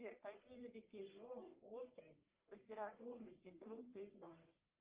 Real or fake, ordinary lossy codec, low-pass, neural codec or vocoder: fake; Opus, 24 kbps; 3.6 kHz; codec, 44.1 kHz, 1.7 kbps, Pupu-Codec